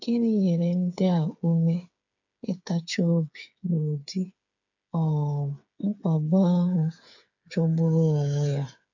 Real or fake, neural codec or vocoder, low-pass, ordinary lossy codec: fake; codec, 16 kHz, 8 kbps, FreqCodec, smaller model; 7.2 kHz; none